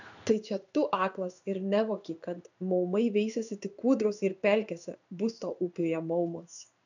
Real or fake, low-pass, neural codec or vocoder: fake; 7.2 kHz; codec, 16 kHz in and 24 kHz out, 1 kbps, XY-Tokenizer